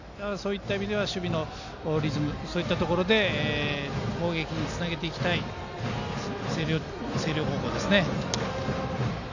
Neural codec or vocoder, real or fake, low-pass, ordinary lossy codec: none; real; 7.2 kHz; none